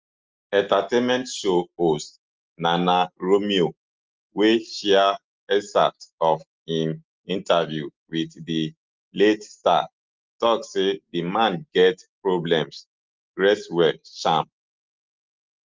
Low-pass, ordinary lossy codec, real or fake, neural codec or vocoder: 7.2 kHz; Opus, 32 kbps; real; none